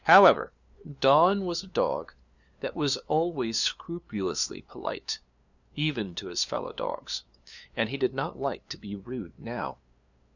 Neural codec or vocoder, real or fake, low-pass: codec, 16 kHz, 2 kbps, FunCodec, trained on LibriTTS, 25 frames a second; fake; 7.2 kHz